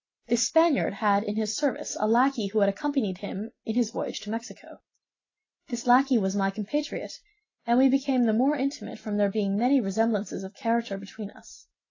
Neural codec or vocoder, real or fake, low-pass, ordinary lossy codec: none; real; 7.2 kHz; AAC, 32 kbps